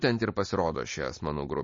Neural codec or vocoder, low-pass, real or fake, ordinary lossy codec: none; 7.2 kHz; real; MP3, 32 kbps